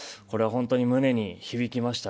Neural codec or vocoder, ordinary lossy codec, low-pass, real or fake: none; none; none; real